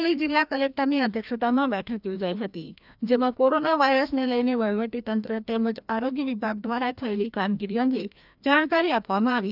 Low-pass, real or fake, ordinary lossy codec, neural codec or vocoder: 5.4 kHz; fake; none; codec, 16 kHz, 1 kbps, FreqCodec, larger model